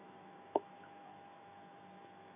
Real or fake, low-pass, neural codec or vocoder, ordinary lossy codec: fake; 3.6 kHz; autoencoder, 48 kHz, 128 numbers a frame, DAC-VAE, trained on Japanese speech; AAC, 16 kbps